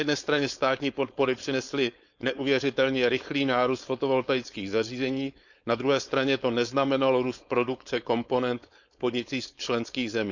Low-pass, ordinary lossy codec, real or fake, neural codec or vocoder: 7.2 kHz; none; fake; codec, 16 kHz, 4.8 kbps, FACodec